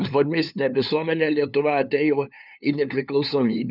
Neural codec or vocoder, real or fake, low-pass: codec, 16 kHz, 2 kbps, FunCodec, trained on LibriTTS, 25 frames a second; fake; 5.4 kHz